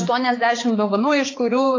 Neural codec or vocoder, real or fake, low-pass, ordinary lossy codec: codec, 16 kHz, 4 kbps, X-Codec, HuBERT features, trained on balanced general audio; fake; 7.2 kHz; AAC, 32 kbps